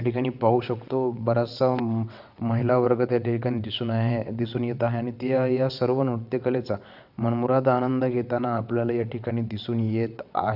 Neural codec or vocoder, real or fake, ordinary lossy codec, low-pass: vocoder, 22.05 kHz, 80 mel bands, WaveNeXt; fake; none; 5.4 kHz